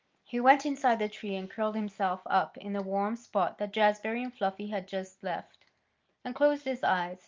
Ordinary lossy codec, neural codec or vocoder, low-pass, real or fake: Opus, 32 kbps; none; 7.2 kHz; real